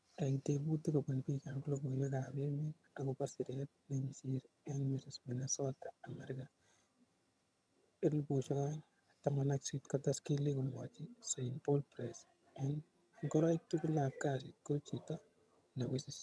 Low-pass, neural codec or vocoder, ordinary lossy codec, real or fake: none; vocoder, 22.05 kHz, 80 mel bands, HiFi-GAN; none; fake